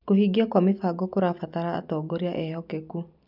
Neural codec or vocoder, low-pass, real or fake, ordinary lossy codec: none; 5.4 kHz; real; none